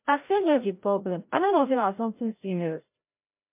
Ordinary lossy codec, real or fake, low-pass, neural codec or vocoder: MP3, 32 kbps; fake; 3.6 kHz; codec, 16 kHz, 0.5 kbps, FreqCodec, larger model